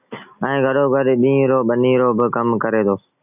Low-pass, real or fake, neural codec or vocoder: 3.6 kHz; real; none